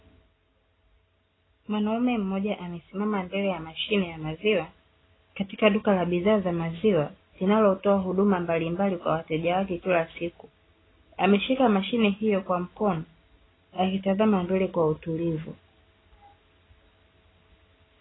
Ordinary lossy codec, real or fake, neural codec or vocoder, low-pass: AAC, 16 kbps; real; none; 7.2 kHz